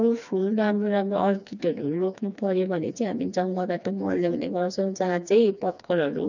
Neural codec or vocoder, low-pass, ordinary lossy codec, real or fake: codec, 16 kHz, 2 kbps, FreqCodec, smaller model; 7.2 kHz; none; fake